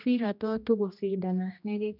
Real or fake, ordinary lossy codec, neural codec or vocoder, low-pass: fake; none; codec, 16 kHz, 1 kbps, X-Codec, HuBERT features, trained on general audio; 5.4 kHz